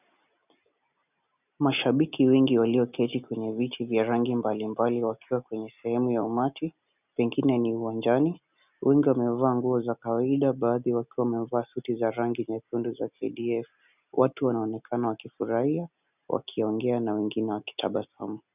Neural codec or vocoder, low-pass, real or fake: none; 3.6 kHz; real